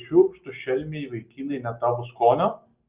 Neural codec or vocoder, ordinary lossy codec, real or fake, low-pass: none; Opus, 32 kbps; real; 3.6 kHz